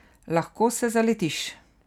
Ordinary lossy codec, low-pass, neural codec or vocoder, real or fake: none; 19.8 kHz; none; real